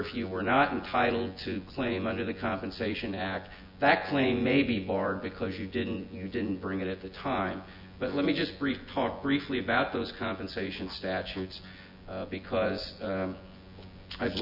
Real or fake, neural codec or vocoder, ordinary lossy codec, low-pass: fake; vocoder, 24 kHz, 100 mel bands, Vocos; MP3, 48 kbps; 5.4 kHz